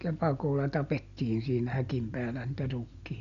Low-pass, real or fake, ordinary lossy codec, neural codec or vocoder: 7.2 kHz; real; none; none